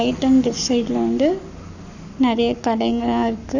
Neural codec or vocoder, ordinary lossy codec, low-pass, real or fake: codec, 44.1 kHz, 7.8 kbps, Pupu-Codec; none; 7.2 kHz; fake